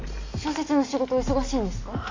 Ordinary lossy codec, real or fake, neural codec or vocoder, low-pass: AAC, 32 kbps; real; none; 7.2 kHz